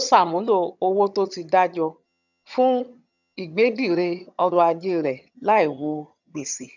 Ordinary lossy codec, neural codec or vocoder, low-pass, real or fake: none; vocoder, 22.05 kHz, 80 mel bands, HiFi-GAN; 7.2 kHz; fake